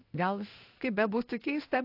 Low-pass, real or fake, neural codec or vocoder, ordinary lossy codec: 5.4 kHz; fake; codec, 16 kHz in and 24 kHz out, 1 kbps, XY-Tokenizer; AAC, 32 kbps